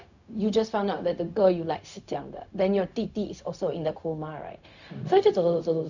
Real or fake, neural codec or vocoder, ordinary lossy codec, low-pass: fake; codec, 16 kHz, 0.4 kbps, LongCat-Audio-Codec; none; 7.2 kHz